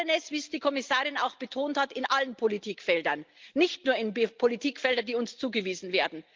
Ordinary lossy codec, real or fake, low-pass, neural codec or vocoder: Opus, 24 kbps; real; 7.2 kHz; none